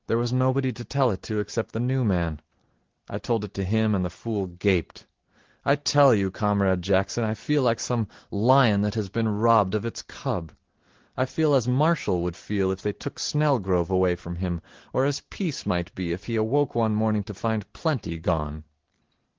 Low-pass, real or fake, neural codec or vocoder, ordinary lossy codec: 7.2 kHz; real; none; Opus, 16 kbps